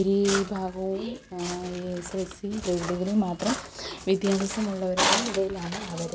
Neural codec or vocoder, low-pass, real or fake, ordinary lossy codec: none; none; real; none